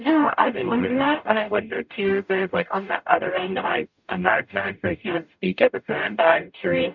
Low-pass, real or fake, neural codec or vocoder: 7.2 kHz; fake; codec, 44.1 kHz, 0.9 kbps, DAC